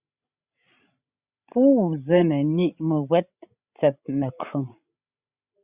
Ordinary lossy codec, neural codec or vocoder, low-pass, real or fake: Opus, 64 kbps; codec, 16 kHz, 16 kbps, FreqCodec, larger model; 3.6 kHz; fake